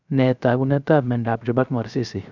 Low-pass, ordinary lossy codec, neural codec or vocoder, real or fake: 7.2 kHz; none; codec, 16 kHz, 0.3 kbps, FocalCodec; fake